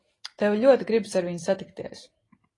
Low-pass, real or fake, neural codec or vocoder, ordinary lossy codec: 10.8 kHz; real; none; AAC, 32 kbps